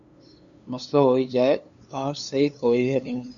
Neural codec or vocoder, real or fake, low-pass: codec, 16 kHz, 2 kbps, FunCodec, trained on LibriTTS, 25 frames a second; fake; 7.2 kHz